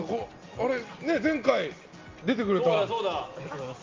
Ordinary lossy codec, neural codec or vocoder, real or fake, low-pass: Opus, 32 kbps; none; real; 7.2 kHz